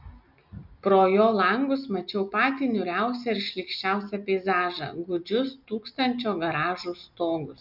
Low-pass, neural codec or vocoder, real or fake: 5.4 kHz; none; real